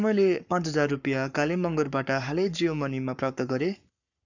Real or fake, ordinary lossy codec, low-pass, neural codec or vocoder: fake; none; 7.2 kHz; codec, 44.1 kHz, 7.8 kbps, Pupu-Codec